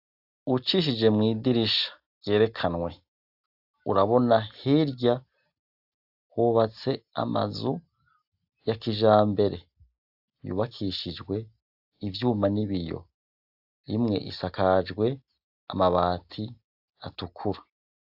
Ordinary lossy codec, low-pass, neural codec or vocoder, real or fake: AAC, 48 kbps; 5.4 kHz; none; real